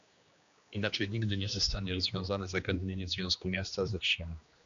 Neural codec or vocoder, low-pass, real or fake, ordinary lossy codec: codec, 16 kHz, 2 kbps, X-Codec, HuBERT features, trained on general audio; 7.2 kHz; fake; AAC, 64 kbps